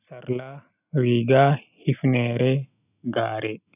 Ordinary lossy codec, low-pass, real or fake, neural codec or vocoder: none; 3.6 kHz; real; none